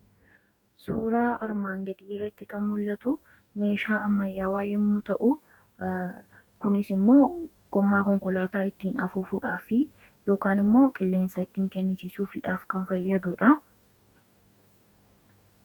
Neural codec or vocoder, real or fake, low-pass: codec, 44.1 kHz, 2.6 kbps, DAC; fake; 19.8 kHz